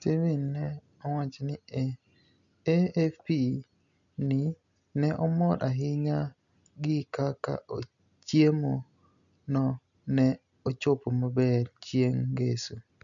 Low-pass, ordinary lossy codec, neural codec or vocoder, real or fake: 7.2 kHz; none; none; real